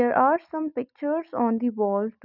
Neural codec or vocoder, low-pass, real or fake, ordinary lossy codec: none; 5.4 kHz; real; none